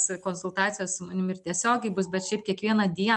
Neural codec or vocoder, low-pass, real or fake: none; 10.8 kHz; real